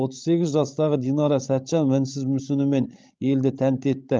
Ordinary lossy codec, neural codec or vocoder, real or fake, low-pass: Opus, 32 kbps; codec, 16 kHz, 16 kbps, FreqCodec, larger model; fake; 7.2 kHz